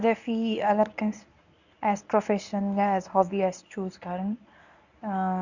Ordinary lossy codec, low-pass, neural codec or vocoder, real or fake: none; 7.2 kHz; codec, 24 kHz, 0.9 kbps, WavTokenizer, medium speech release version 1; fake